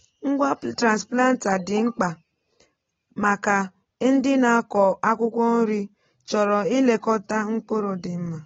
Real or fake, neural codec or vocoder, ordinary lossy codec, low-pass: real; none; AAC, 24 kbps; 7.2 kHz